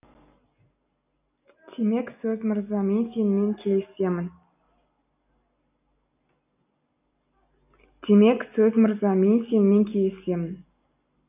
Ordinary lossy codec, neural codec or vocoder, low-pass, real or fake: none; none; 3.6 kHz; real